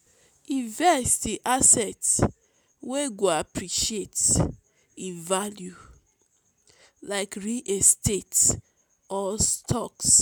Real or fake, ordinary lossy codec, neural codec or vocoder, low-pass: real; none; none; none